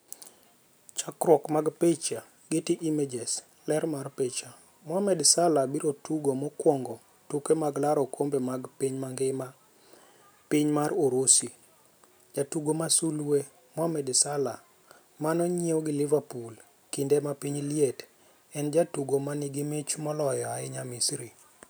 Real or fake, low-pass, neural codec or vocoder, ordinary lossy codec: real; none; none; none